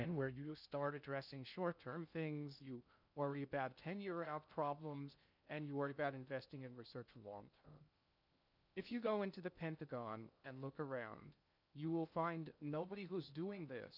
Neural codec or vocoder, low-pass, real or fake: codec, 16 kHz in and 24 kHz out, 0.6 kbps, FocalCodec, streaming, 2048 codes; 5.4 kHz; fake